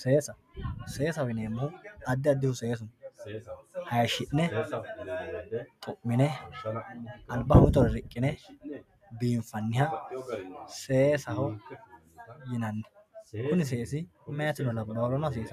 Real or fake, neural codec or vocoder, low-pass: real; none; 14.4 kHz